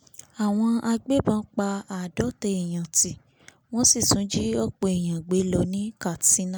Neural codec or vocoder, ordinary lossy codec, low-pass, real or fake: none; none; none; real